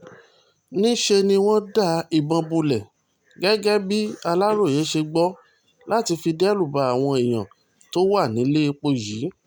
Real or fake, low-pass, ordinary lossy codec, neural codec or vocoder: real; none; none; none